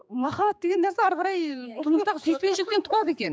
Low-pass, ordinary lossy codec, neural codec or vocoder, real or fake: none; none; codec, 16 kHz, 4 kbps, X-Codec, HuBERT features, trained on general audio; fake